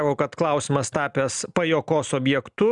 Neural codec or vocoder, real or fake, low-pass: none; real; 10.8 kHz